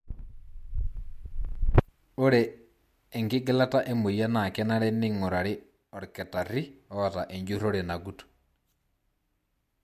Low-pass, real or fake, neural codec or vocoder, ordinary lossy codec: 14.4 kHz; real; none; MP3, 64 kbps